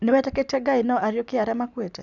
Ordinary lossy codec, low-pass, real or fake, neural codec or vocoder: none; 7.2 kHz; real; none